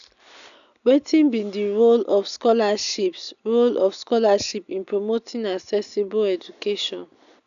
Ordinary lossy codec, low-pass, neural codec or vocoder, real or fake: none; 7.2 kHz; none; real